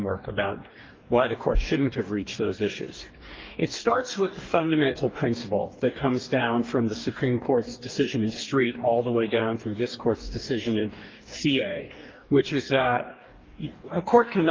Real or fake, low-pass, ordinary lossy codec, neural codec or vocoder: fake; 7.2 kHz; Opus, 32 kbps; codec, 44.1 kHz, 2.6 kbps, DAC